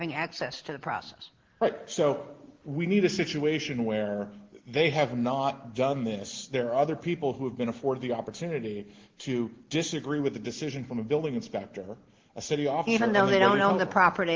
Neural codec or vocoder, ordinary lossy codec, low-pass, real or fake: none; Opus, 16 kbps; 7.2 kHz; real